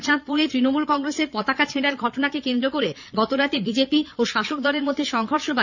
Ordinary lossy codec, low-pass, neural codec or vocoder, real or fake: none; 7.2 kHz; vocoder, 22.05 kHz, 80 mel bands, Vocos; fake